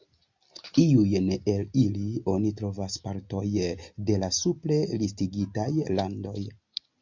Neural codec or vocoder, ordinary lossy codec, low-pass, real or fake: none; MP3, 64 kbps; 7.2 kHz; real